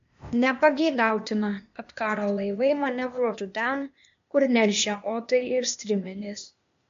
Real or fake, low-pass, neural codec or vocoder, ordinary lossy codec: fake; 7.2 kHz; codec, 16 kHz, 0.8 kbps, ZipCodec; MP3, 48 kbps